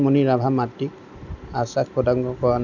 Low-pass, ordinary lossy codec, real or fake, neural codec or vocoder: 7.2 kHz; none; real; none